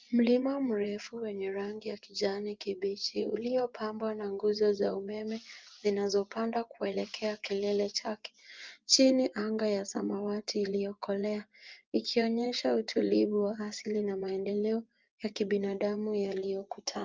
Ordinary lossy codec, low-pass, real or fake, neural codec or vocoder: Opus, 24 kbps; 7.2 kHz; fake; vocoder, 24 kHz, 100 mel bands, Vocos